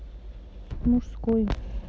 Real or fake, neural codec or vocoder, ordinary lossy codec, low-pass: real; none; none; none